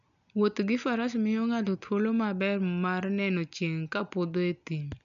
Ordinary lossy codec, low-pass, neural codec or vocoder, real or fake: none; 7.2 kHz; none; real